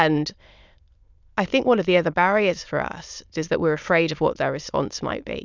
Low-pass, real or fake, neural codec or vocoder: 7.2 kHz; fake; autoencoder, 22.05 kHz, a latent of 192 numbers a frame, VITS, trained on many speakers